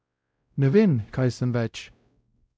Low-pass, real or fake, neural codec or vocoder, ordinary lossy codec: none; fake; codec, 16 kHz, 0.5 kbps, X-Codec, WavLM features, trained on Multilingual LibriSpeech; none